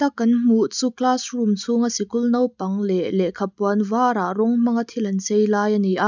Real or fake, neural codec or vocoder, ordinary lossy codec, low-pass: real; none; none; 7.2 kHz